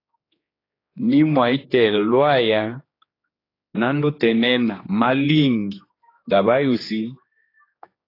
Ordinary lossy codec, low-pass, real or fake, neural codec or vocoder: AAC, 24 kbps; 5.4 kHz; fake; codec, 16 kHz, 2 kbps, X-Codec, HuBERT features, trained on general audio